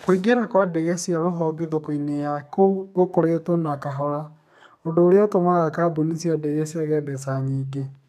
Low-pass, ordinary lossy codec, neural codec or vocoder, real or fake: 14.4 kHz; none; codec, 32 kHz, 1.9 kbps, SNAC; fake